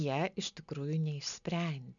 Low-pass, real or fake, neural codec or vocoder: 7.2 kHz; real; none